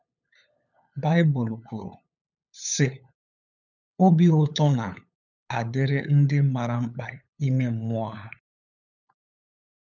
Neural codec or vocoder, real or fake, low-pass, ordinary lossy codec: codec, 16 kHz, 8 kbps, FunCodec, trained on LibriTTS, 25 frames a second; fake; 7.2 kHz; none